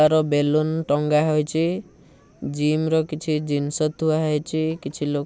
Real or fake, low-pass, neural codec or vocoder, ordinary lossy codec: real; none; none; none